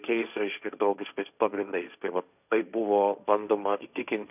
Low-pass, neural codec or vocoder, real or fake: 3.6 kHz; codec, 16 kHz, 1.1 kbps, Voila-Tokenizer; fake